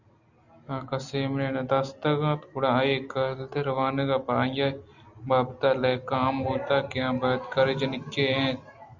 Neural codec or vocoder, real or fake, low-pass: none; real; 7.2 kHz